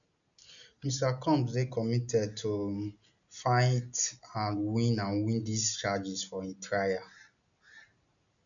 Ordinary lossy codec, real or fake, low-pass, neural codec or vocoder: none; real; 7.2 kHz; none